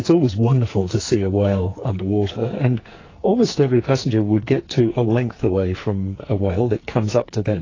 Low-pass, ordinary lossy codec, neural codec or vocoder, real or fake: 7.2 kHz; AAC, 32 kbps; codec, 44.1 kHz, 2.6 kbps, SNAC; fake